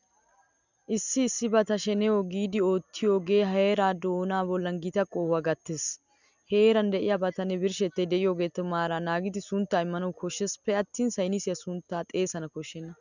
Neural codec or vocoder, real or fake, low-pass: none; real; 7.2 kHz